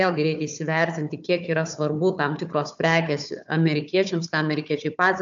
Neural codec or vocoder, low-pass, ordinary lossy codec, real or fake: codec, 16 kHz, 4 kbps, FunCodec, trained on Chinese and English, 50 frames a second; 7.2 kHz; AAC, 64 kbps; fake